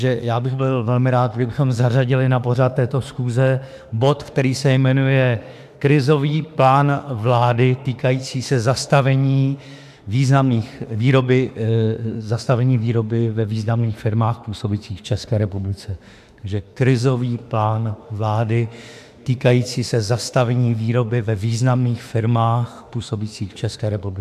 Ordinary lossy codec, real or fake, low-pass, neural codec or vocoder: AAC, 96 kbps; fake; 14.4 kHz; autoencoder, 48 kHz, 32 numbers a frame, DAC-VAE, trained on Japanese speech